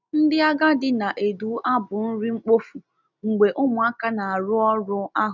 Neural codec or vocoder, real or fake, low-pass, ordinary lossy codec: none; real; 7.2 kHz; none